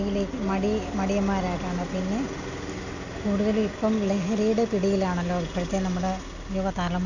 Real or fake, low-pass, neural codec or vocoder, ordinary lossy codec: real; 7.2 kHz; none; none